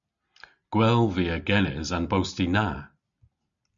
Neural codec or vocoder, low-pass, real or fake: none; 7.2 kHz; real